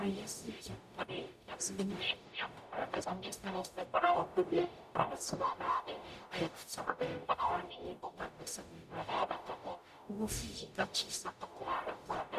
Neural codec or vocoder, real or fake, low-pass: codec, 44.1 kHz, 0.9 kbps, DAC; fake; 14.4 kHz